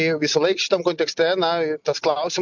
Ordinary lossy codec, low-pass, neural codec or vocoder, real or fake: MP3, 64 kbps; 7.2 kHz; none; real